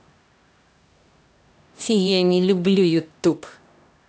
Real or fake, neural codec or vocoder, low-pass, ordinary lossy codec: fake; codec, 16 kHz, 1 kbps, X-Codec, HuBERT features, trained on LibriSpeech; none; none